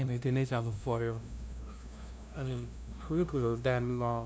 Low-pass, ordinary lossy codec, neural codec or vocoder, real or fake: none; none; codec, 16 kHz, 0.5 kbps, FunCodec, trained on LibriTTS, 25 frames a second; fake